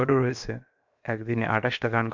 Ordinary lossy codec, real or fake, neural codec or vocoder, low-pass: MP3, 64 kbps; fake; codec, 16 kHz, 0.7 kbps, FocalCodec; 7.2 kHz